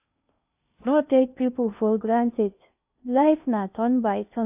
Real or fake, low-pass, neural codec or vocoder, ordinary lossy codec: fake; 3.6 kHz; codec, 16 kHz in and 24 kHz out, 0.6 kbps, FocalCodec, streaming, 2048 codes; AAC, 32 kbps